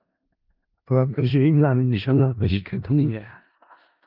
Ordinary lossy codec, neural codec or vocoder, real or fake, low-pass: Opus, 24 kbps; codec, 16 kHz in and 24 kHz out, 0.4 kbps, LongCat-Audio-Codec, four codebook decoder; fake; 5.4 kHz